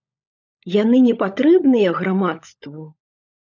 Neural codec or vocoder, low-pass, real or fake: codec, 16 kHz, 16 kbps, FunCodec, trained on LibriTTS, 50 frames a second; 7.2 kHz; fake